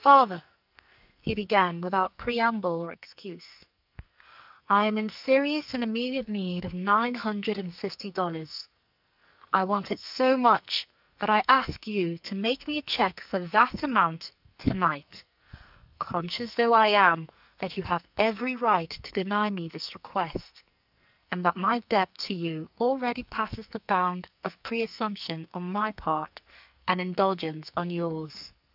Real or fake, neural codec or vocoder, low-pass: fake; codec, 44.1 kHz, 2.6 kbps, SNAC; 5.4 kHz